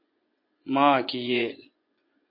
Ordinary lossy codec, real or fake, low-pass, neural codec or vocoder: MP3, 32 kbps; fake; 5.4 kHz; vocoder, 24 kHz, 100 mel bands, Vocos